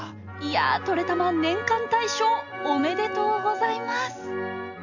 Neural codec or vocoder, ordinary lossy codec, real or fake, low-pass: none; none; real; 7.2 kHz